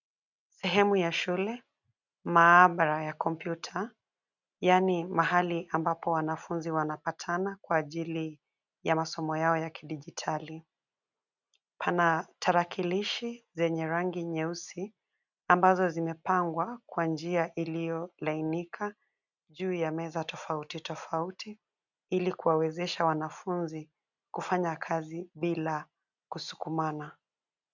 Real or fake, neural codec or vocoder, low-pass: real; none; 7.2 kHz